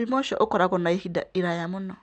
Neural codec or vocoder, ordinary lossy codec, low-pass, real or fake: none; none; 9.9 kHz; real